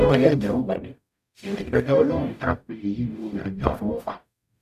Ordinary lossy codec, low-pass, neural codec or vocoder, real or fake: none; 14.4 kHz; codec, 44.1 kHz, 0.9 kbps, DAC; fake